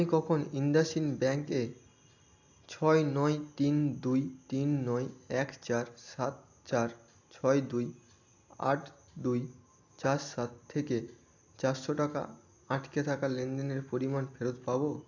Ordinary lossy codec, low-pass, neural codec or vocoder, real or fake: AAC, 48 kbps; 7.2 kHz; none; real